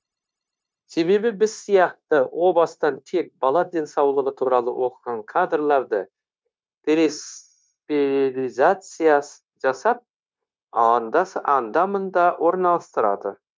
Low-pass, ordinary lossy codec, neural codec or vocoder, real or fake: none; none; codec, 16 kHz, 0.9 kbps, LongCat-Audio-Codec; fake